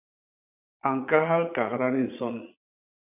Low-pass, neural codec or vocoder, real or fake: 3.6 kHz; vocoder, 22.05 kHz, 80 mel bands, Vocos; fake